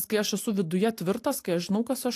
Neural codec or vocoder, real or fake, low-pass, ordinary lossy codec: none; real; 14.4 kHz; AAC, 64 kbps